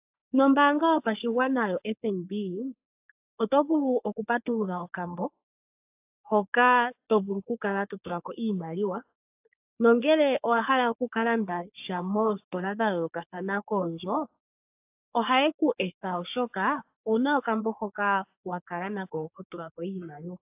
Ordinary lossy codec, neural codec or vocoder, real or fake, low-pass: AAC, 32 kbps; codec, 44.1 kHz, 3.4 kbps, Pupu-Codec; fake; 3.6 kHz